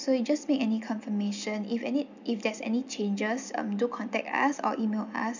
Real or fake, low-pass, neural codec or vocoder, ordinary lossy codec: real; 7.2 kHz; none; none